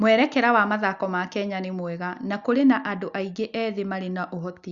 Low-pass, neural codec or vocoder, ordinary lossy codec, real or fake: 7.2 kHz; none; Opus, 64 kbps; real